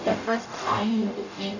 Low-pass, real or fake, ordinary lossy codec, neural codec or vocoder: 7.2 kHz; fake; none; codec, 44.1 kHz, 0.9 kbps, DAC